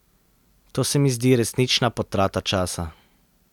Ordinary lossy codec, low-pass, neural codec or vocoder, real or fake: none; 19.8 kHz; none; real